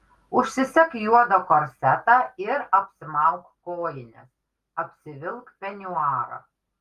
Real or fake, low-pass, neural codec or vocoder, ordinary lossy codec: real; 14.4 kHz; none; Opus, 24 kbps